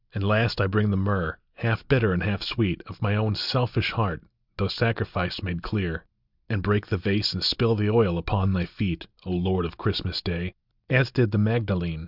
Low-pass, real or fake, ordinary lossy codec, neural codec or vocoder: 5.4 kHz; real; Opus, 64 kbps; none